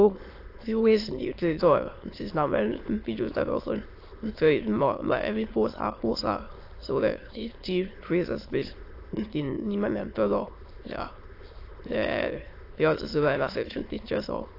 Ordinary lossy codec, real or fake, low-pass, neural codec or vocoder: AAC, 32 kbps; fake; 5.4 kHz; autoencoder, 22.05 kHz, a latent of 192 numbers a frame, VITS, trained on many speakers